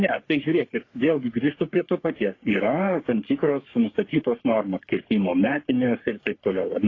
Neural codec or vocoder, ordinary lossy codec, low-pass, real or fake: codec, 44.1 kHz, 3.4 kbps, Pupu-Codec; AAC, 32 kbps; 7.2 kHz; fake